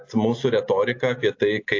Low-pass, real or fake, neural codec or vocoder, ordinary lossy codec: 7.2 kHz; real; none; AAC, 48 kbps